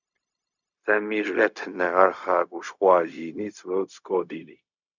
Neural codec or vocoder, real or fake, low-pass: codec, 16 kHz, 0.4 kbps, LongCat-Audio-Codec; fake; 7.2 kHz